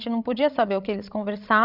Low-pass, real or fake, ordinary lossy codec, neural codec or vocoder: 5.4 kHz; fake; none; codec, 16 kHz, 16 kbps, FreqCodec, larger model